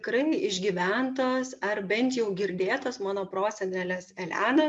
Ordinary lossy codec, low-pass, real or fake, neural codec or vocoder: MP3, 64 kbps; 10.8 kHz; real; none